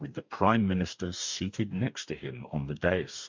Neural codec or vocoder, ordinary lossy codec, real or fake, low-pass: codec, 44.1 kHz, 2.6 kbps, DAC; MP3, 64 kbps; fake; 7.2 kHz